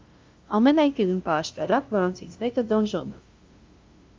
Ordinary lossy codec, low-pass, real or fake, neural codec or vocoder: Opus, 32 kbps; 7.2 kHz; fake; codec, 16 kHz, 0.5 kbps, FunCodec, trained on LibriTTS, 25 frames a second